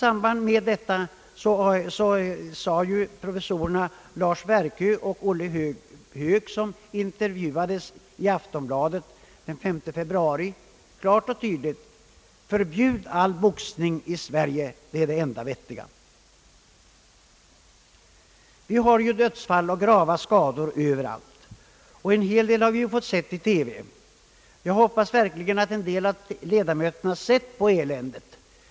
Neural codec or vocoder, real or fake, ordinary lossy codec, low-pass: none; real; none; none